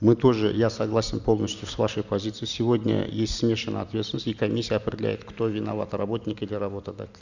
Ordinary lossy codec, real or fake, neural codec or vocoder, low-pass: none; real; none; 7.2 kHz